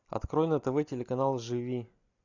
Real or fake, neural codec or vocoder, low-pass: real; none; 7.2 kHz